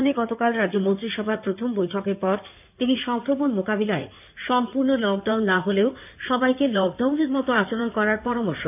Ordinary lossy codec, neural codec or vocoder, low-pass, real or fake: none; codec, 16 kHz in and 24 kHz out, 2.2 kbps, FireRedTTS-2 codec; 3.6 kHz; fake